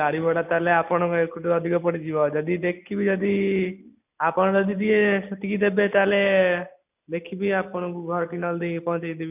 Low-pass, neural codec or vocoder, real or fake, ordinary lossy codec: 3.6 kHz; none; real; none